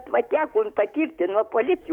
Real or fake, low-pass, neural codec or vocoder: fake; 19.8 kHz; codec, 44.1 kHz, 7.8 kbps, DAC